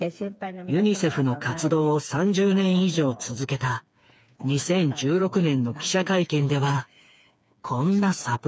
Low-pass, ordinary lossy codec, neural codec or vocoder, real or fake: none; none; codec, 16 kHz, 4 kbps, FreqCodec, smaller model; fake